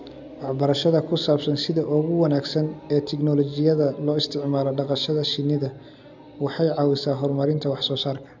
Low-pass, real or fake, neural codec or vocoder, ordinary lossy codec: 7.2 kHz; real; none; none